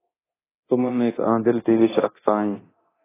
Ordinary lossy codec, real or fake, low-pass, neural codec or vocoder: AAC, 16 kbps; fake; 3.6 kHz; codec, 24 kHz, 0.9 kbps, DualCodec